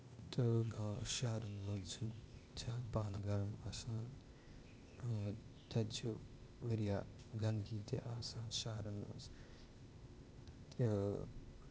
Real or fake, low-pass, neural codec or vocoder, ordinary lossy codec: fake; none; codec, 16 kHz, 0.8 kbps, ZipCodec; none